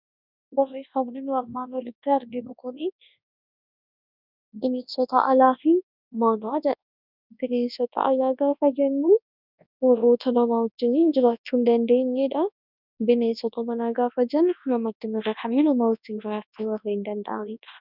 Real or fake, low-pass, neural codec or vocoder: fake; 5.4 kHz; codec, 24 kHz, 0.9 kbps, WavTokenizer, large speech release